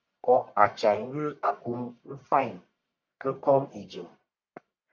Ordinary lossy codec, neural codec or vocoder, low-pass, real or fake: MP3, 64 kbps; codec, 44.1 kHz, 1.7 kbps, Pupu-Codec; 7.2 kHz; fake